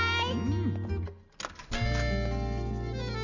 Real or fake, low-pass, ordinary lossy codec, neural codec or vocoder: real; 7.2 kHz; none; none